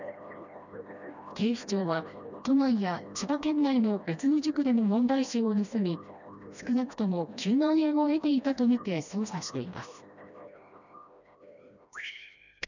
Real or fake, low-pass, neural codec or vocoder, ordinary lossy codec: fake; 7.2 kHz; codec, 16 kHz, 1 kbps, FreqCodec, smaller model; none